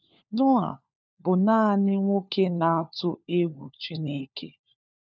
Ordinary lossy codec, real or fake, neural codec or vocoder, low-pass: none; fake; codec, 16 kHz, 4 kbps, FunCodec, trained on LibriTTS, 50 frames a second; none